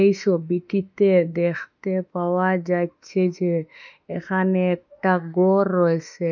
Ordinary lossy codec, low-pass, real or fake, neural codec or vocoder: none; 7.2 kHz; fake; autoencoder, 48 kHz, 32 numbers a frame, DAC-VAE, trained on Japanese speech